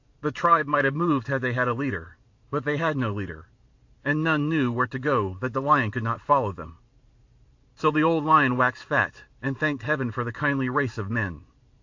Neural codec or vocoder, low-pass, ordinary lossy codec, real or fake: vocoder, 44.1 kHz, 128 mel bands every 512 samples, BigVGAN v2; 7.2 kHz; AAC, 48 kbps; fake